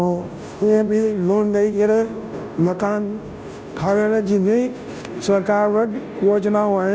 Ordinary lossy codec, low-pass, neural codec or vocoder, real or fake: none; none; codec, 16 kHz, 0.5 kbps, FunCodec, trained on Chinese and English, 25 frames a second; fake